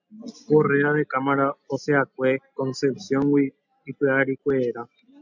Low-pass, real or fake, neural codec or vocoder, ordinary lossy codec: 7.2 kHz; real; none; MP3, 64 kbps